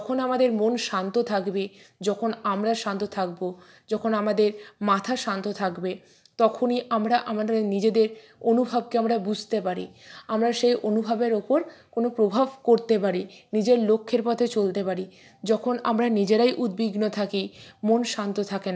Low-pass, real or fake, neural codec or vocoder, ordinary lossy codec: none; real; none; none